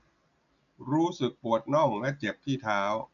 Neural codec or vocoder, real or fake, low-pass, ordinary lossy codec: none; real; 7.2 kHz; none